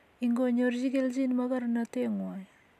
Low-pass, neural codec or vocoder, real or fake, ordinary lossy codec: 14.4 kHz; none; real; none